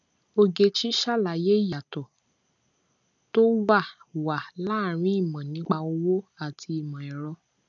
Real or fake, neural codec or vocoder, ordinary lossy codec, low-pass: real; none; none; 7.2 kHz